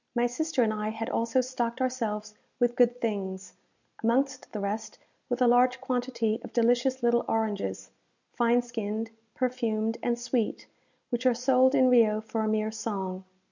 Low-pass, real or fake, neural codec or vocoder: 7.2 kHz; real; none